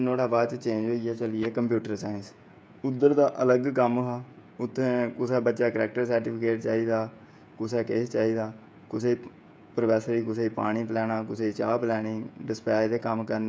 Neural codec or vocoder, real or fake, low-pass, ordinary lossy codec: codec, 16 kHz, 16 kbps, FreqCodec, smaller model; fake; none; none